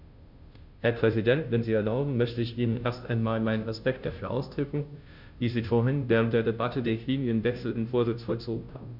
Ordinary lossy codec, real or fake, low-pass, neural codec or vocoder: none; fake; 5.4 kHz; codec, 16 kHz, 0.5 kbps, FunCodec, trained on Chinese and English, 25 frames a second